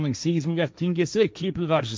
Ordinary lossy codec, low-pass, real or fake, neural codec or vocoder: none; none; fake; codec, 16 kHz, 1.1 kbps, Voila-Tokenizer